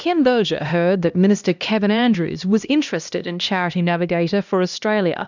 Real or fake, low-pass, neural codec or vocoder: fake; 7.2 kHz; codec, 16 kHz, 1 kbps, X-Codec, HuBERT features, trained on LibriSpeech